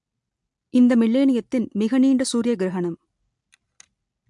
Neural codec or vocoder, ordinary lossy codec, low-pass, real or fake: none; MP3, 64 kbps; 10.8 kHz; real